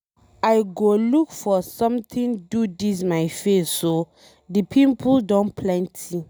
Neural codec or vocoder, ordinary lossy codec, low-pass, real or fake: none; none; none; real